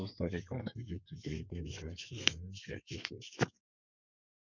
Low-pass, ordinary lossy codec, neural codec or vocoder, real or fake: 7.2 kHz; none; codec, 32 kHz, 1.9 kbps, SNAC; fake